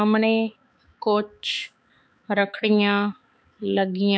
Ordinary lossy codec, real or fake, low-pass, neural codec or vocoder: none; fake; none; codec, 16 kHz, 4 kbps, X-Codec, HuBERT features, trained on balanced general audio